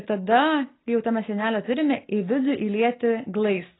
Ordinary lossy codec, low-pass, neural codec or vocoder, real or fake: AAC, 16 kbps; 7.2 kHz; none; real